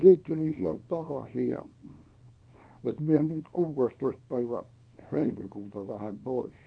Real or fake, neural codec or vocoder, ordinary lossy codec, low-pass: fake; codec, 24 kHz, 0.9 kbps, WavTokenizer, small release; none; 9.9 kHz